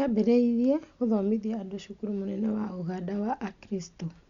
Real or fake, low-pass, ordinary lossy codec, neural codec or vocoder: real; 7.2 kHz; Opus, 64 kbps; none